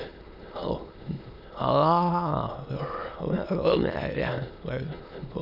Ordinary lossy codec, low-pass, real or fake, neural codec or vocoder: none; 5.4 kHz; fake; autoencoder, 22.05 kHz, a latent of 192 numbers a frame, VITS, trained on many speakers